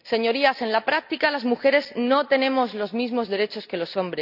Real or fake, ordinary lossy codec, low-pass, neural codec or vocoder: real; none; 5.4 kHz; none